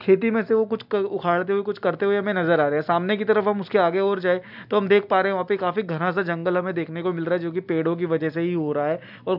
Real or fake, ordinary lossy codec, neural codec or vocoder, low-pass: real; none; none; 5.4 kHz